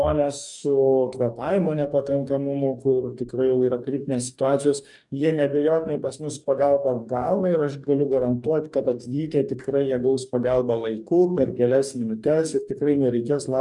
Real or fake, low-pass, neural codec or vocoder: fake; 10.8 kHz; codec, 44.1 kHz, 2.6 kbps, DAC